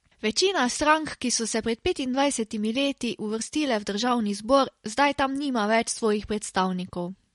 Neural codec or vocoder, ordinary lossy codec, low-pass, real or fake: none; MP3, 48 kbps; 19.8 kHz; real